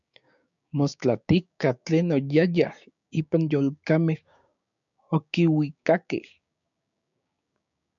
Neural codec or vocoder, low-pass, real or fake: codec, 16 kHz, 6 kbps, DAC; 7.2 kHz; fake